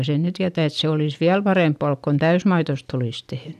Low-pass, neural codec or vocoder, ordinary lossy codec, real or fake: 14.4 kHz; none; none; real